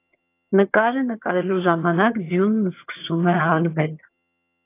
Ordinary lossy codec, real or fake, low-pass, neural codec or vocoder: AAC, 24 kbps; fake; 3.6 kHz; vocoder, 22.05 kHz, 80 mel bands, HiFi-GAN